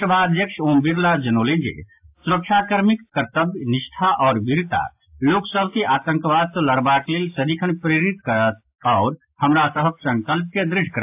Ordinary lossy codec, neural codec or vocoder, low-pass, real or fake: AAC, 32 kbps; none; 3.6 kHz; real